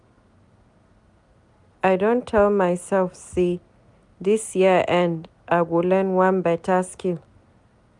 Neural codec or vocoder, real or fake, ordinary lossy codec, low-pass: none; real; none; 10.8 kHz